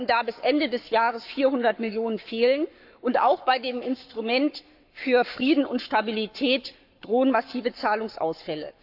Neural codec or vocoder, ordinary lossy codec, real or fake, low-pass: codec, 44.1 kHz, 7.8 kbps, Pupu-Codec; AAC, 48 kbps; fake; 5.4 kHz